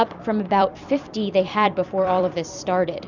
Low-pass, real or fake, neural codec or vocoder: 7.2 kHz; fake; vocoder, 44.1 kHz, 128 mel bands every 256 samples, BigVGAN v2